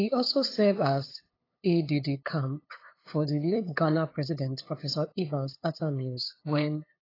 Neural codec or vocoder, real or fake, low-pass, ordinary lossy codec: codec, 16 kHz, 8 kbps, FunCodec, trained on LibriTTS, 25 frames a second; fake; 5.4 kHz; AAC, 24 kbps